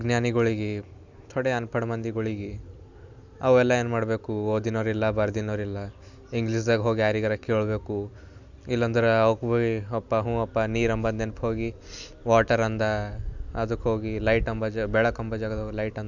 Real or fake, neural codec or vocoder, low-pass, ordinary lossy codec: real; none; 7.2 kHz; Opus, 64 kbps